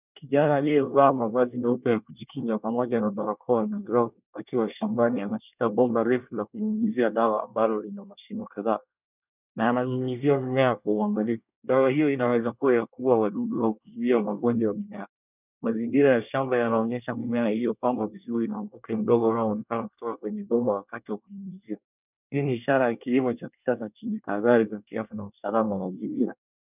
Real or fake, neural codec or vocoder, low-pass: fake; codec, 24 kHz, 1 kbps, SNAC; 3.6 kHz